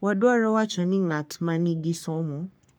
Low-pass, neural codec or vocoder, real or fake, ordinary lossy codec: none; codec, 44.1 kHz, 3.4 kbps, Pupu-Codec; fake; none